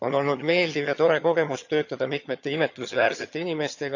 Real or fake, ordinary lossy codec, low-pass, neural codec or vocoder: fake; none; 7.2 kHz; vocoder, 22.05 kHz, 80 mel bands, HiFi-GAN